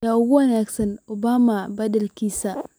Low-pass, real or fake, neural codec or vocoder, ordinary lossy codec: none; real; none; none